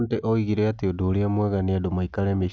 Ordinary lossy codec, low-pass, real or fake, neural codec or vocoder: none; none; real; none